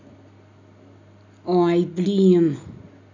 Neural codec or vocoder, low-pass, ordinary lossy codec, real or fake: none; 7.2 kHz; none; real